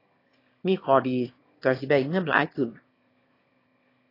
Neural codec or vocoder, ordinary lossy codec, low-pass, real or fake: autoencoder, 22.05 kHz, a latent of 192 numbers a frame, VITS, trained on one speaker; AAC, 24 kbps; 5.4 kHz; fake